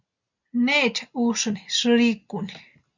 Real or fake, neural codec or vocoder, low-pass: real; none; 7.2 kHz